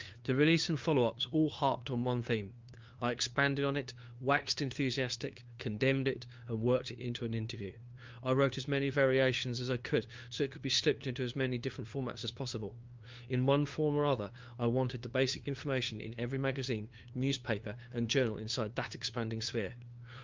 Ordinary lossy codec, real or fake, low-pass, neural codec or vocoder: Opus, 24 kbps; fake; 7.2 kHz; codec, 16 kHz, 2 kbps, FunCodec, trained on LibriTTS, 25 frames a second